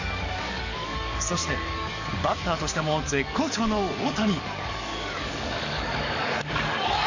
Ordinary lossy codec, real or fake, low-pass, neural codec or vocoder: none; fake; 7.2 kHz; codec, 44.1 kHz, 7.8 kbps, DAC